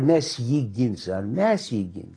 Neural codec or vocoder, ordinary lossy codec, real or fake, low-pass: none; AAC, 32 kbps; real; 9.9 kHz